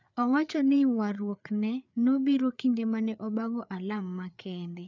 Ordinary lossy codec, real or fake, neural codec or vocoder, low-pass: none; fake; codec, 16 kHz, 4 kbps, FreqCodec, larger model; 7.2 kHz